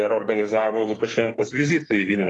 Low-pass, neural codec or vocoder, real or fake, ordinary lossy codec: 10.8 kHz; codec, 32 kHz, 1.9 kbps, SNAC; fake; AAC, 32 kbps